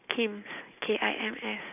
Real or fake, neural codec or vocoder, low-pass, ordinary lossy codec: real; none; 3.6 kHz; none